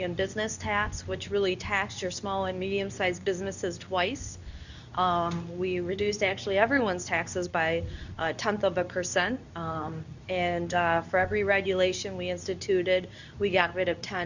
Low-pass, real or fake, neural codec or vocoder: 7.2 kHz; fake; codec, 24 kHz, 0.9 kbps, WavTokenizer, medium speech release version 2